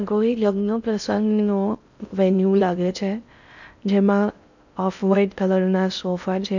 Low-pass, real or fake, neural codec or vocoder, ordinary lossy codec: 7.2 kHz; fake; codec, 16 kHz in and 24 kHz out, 0.6 kbps, FocalCodec, streaming, 4096 codes; none